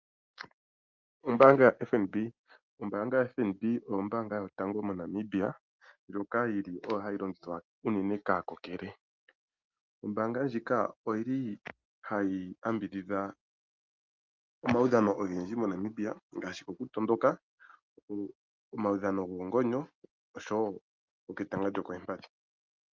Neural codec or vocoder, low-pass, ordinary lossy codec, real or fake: none; 7.2 kHz; Opus, 32 kbps; real